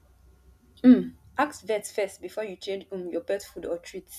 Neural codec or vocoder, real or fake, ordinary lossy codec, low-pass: none; real; none; 14.4 kHz